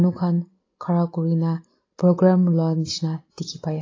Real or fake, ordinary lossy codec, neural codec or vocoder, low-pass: real; AAC, 32 kbps; none; 7.2 kHz